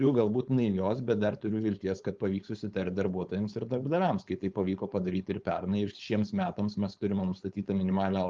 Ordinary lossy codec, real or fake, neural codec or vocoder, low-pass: Opus, 32 kbps; fake; codec, 16 kHz, 4.8 kbps, FACodec; 7.2 kHz